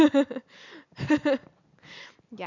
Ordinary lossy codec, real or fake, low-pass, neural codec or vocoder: none; real; 7.2 kHz; none